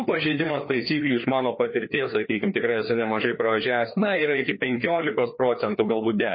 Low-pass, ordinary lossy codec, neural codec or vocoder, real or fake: 7.2 kHz; MP3, 24 kbps; codec, 16 kHz, 2 kbps, FreqCodec, larger model; fake